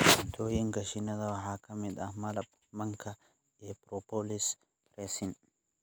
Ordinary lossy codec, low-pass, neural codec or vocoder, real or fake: none; none; none; real